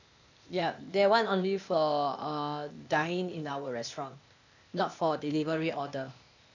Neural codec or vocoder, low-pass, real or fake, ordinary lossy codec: codec, 16 kHz, 0.8 kbps, ZipCodec; 7.2 kHz; fake; none